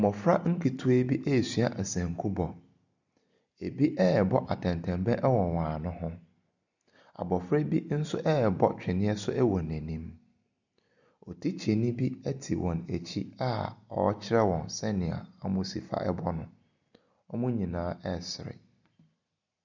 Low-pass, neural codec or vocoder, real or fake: 7.2 kHz; none; real